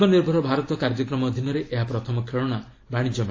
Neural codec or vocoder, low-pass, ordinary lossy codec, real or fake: none; 7.2 kHz; AAC, 32 kbps; real